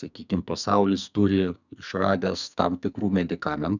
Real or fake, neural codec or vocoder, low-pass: fake; codec, 44.1 kHz, 2.6 kbps, SNAC; 7.2 kHz